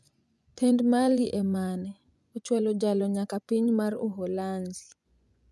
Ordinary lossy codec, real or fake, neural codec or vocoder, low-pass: none; fake; vocoder, 24 kHz, 100 mel bands, Vocos; none